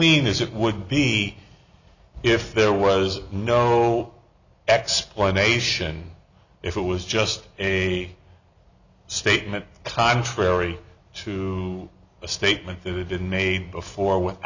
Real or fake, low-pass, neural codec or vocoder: real; 7.2 kHz; none